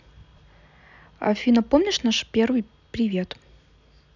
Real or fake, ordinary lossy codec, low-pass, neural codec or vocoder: real; none; 7.2 kHz; none